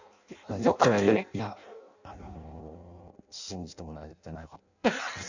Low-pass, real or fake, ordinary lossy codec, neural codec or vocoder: 7.2 kHz; fake; none; codec, 16 kHz in and 24 kHz out, 0.6 kbps, FireRedTTS-2 codec